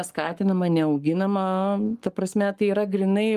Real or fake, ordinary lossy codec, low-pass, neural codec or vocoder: fake; Opus, 32 kbps; 14.4 kHz; codec, 44.1 kHz, 7.8 kbps, Pupu-Codec